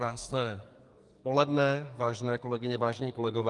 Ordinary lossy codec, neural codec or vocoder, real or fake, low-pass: Opus, 32 kbps; codec, 44.1 kHz, 2.6 kbps, SNAC; fake; 10.8 kHz